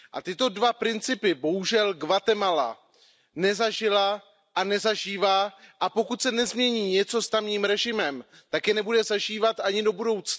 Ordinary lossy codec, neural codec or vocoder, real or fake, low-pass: none; none; real; none